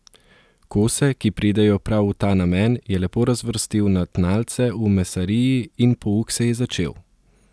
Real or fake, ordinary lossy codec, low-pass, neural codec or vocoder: real; none; none; none